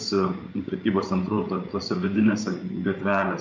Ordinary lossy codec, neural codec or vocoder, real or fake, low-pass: MP3, 48 kbps; codec, 16 kHz, 8 kbps, FreqCodec, larger model; fake; 7.2 kHz